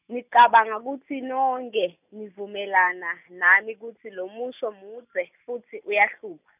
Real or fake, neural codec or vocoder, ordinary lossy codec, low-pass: real; none; none; 3.6 kHz